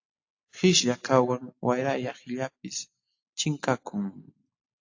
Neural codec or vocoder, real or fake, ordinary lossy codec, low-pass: none; real; AAC, 48 kbps; 7.2 kHz